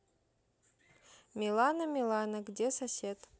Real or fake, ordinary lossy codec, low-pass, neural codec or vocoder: real; none; none; none